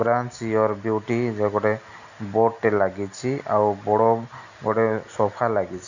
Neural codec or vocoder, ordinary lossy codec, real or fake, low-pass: none; none; real; 7.2 kHz